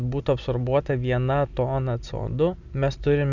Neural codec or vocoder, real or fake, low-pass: none; real; 7.2 kHz